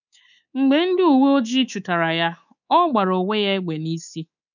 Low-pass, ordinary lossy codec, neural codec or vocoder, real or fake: 7.2 kHz; none; codec, 24 kHz, 3.1 kbps, DualCodec; fake